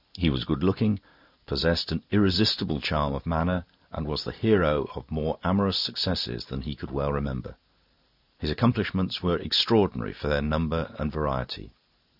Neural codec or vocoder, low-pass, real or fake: none; 5.4 kHz; real